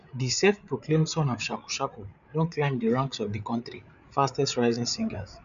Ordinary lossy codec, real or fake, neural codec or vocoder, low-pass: none; fake; codec, 16 kHz, 8 kbps, FreqCodec, larger model; 7.2 kHz